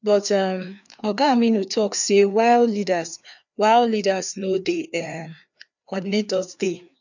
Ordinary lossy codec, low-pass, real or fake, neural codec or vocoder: none; 7.2 kHz; fake; codec, 16 kHz, 2 kbps, FreqCodec, larger model